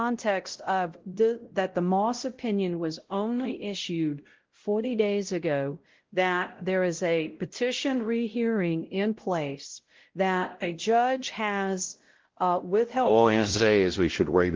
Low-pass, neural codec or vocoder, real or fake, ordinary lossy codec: 7.2 kHz; codec, 16 kHz, 0.5 kbps, X-Codec, WavLM features, trained on Multilingual LibriSpeech; fake; Opus, 16 kbps